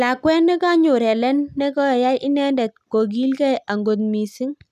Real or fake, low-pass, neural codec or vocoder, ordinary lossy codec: real; 14.4 kHz; none; none